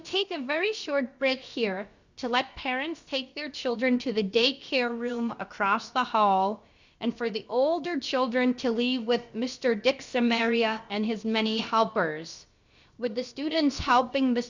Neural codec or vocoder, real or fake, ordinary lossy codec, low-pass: codec, 16 kHz, about 1 kbps, DyCAST, with the encoder's durations; fake; Opus, 64 kbps; 7.2 kHz